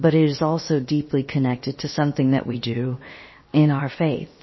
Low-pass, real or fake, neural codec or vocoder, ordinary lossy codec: 7.2 kHz; fake; codec, 16 kHz, 0.7 kbps, FocalCodec; MP3, 24 kbps